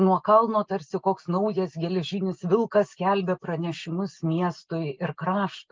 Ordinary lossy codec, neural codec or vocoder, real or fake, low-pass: Opus, 32 kbps; none; real; 7.2 kHz